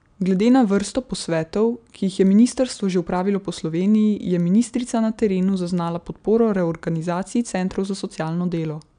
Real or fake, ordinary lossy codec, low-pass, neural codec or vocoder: real; none; 9.9 kHz; none